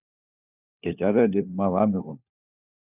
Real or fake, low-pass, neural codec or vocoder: fake; 3.6 kHz; codec, 16 kHz, 4 kbps, FunCodec, trained on LibriTTS, 50 frames a second